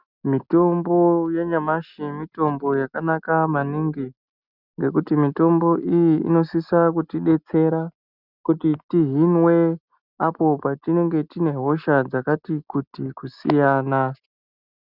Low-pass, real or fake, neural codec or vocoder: 5.4 kHz; real; none